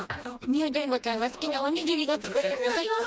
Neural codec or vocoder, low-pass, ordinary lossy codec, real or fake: codec, 16 kHz, 1 kbps, FreqCodec, smaller model; none; none; fake